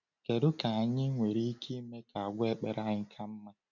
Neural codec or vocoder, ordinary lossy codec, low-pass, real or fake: none; none; 7.2 kHz; real